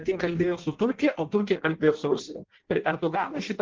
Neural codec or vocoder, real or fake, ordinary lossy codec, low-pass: codec, 16 kHz in and 24 kHz out, 0.6 kbps, FireRedTTS-2 codec; fake; Opus, 16 kbps; 7.2 kHz